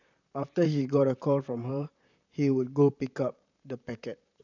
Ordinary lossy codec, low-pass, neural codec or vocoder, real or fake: none; 7.2 kHz; vocoder, 44.1 kHz, 128 mel bands, Pupu-Vocoder; fake